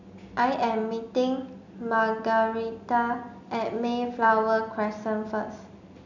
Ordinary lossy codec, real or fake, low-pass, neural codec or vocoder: none; real; 7.2 kHz; none